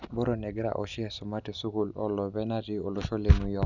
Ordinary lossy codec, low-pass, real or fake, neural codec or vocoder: none; 7.2 kHz; real; none